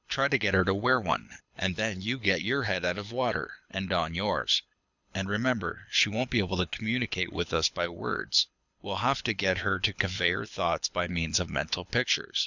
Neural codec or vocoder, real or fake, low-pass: codec, 24 kHz, 6 kbps, HILCodec; fake; 7.2 kHz